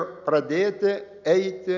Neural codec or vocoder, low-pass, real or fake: none; 7.2 kHz; real